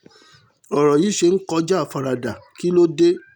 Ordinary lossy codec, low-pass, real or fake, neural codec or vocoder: none; 19.8 kHz; real; none